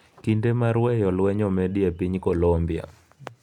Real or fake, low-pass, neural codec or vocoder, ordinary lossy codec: real; 19.8 kHz; none; none